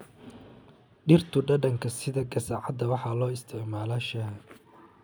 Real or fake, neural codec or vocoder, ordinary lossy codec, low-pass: real; none; none; none